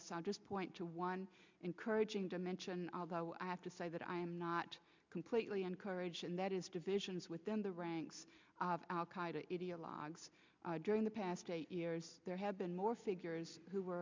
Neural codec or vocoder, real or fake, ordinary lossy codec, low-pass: none; real; MP3, 64 kbps; 7.2 kHz